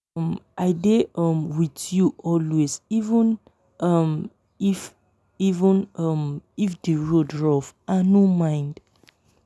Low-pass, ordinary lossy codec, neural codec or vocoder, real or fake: none; none; none; real